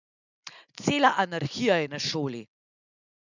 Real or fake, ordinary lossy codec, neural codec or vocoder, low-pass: real; none; none; 7.2 kHz